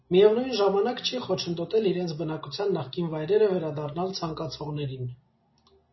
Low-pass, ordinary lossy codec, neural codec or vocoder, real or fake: 7.2 kHz; MP3, 24 kbps; none; real